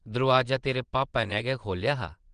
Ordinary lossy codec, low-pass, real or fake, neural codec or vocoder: Opus, 24 kbps; 9.9 kHz; fake; vocoder, 22.05 kHz, 80 mel bands, Vocos